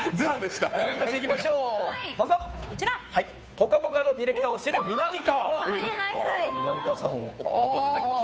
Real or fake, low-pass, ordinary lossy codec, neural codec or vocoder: fake; none; none; codec, 16 kHz, 2 kbps, FunCodec, trained on Chinese and English, 25 frames a second